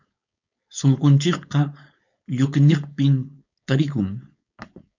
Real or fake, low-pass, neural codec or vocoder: fake; 7.2 kHz; codec, 16 kHz, 4.8 kbps, FACodec